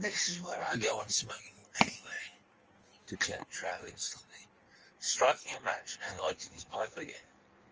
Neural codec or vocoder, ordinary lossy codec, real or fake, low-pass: codec, 16 kHz in and 24 kHz out, 1.1 kbps, FireRedTTS-2 codec; Opus, 24 kbps; fake; 7.2 kHz